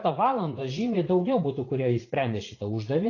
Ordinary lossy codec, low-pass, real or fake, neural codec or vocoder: AAC, 32 kbps; 7.2 kHz; fake; vocoder, 44.1 kHz, 80 mel bands, Vocos